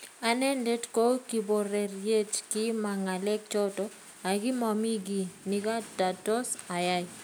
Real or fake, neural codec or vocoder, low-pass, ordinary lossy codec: real; none; none; none